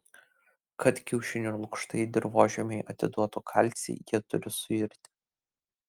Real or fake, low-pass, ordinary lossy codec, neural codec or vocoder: real; 19.8 kHz; Opus, 32 kbps; none